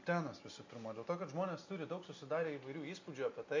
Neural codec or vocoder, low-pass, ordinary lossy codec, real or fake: none; 7.2 kHz; MP3, 64 kbps; real